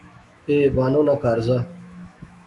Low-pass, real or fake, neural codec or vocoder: 10.8 kHz; fake; autoencoder, 48 kHz, 128 numbers a frame, DAC-VAE, trained on Japanese speech